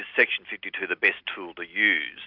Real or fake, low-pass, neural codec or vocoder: real; 5.4 kHz; none